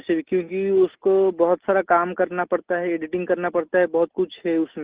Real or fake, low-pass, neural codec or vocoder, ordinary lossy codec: real; 3.6 kHz; none; Opus, 16 kbps